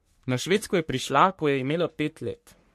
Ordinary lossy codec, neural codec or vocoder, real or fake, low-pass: MP3, 64 kbps; codec, 44.1 kHz, 3.4 kbps, Pupu-Codec; fake; 14.4 kHz